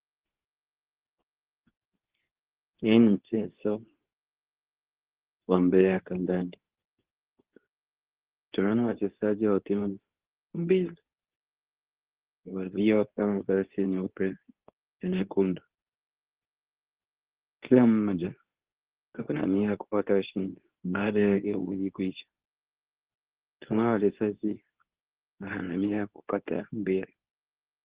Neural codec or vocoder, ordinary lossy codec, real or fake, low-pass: codec, 24 kHz, 0.9 kbps, WavTokenizer, medium speech release version 2; Opus, 16 kbps; fake; 3.6 kHz